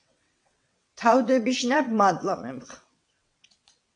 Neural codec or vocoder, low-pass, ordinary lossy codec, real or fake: vocoder, 22.05 kHz, 80 mel bands, WaveNeXt; 9.9 kHz; AAC, 64 kbps; fake